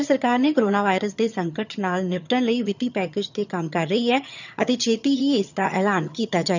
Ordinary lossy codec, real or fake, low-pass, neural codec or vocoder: none; fake; 7.2 kHz; vocoder, 22.05 kHz, 80 mel bands, HiFi-GAN